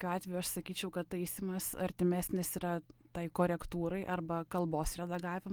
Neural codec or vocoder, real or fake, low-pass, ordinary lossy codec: none; real; 19.8 kHz; Opus, 24 kbps